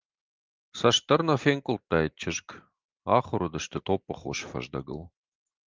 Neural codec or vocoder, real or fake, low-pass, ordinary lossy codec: none; real; 7.2 kHz; Opus, 24 kbps